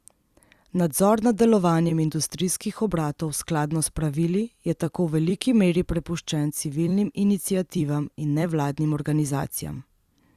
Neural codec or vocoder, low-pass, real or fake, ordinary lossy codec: vocoder, 44.1 kHz, 128 mel bands every 256 samples, BigVGAN v2; 14.4 kHz; fake; Opus, 64 kbps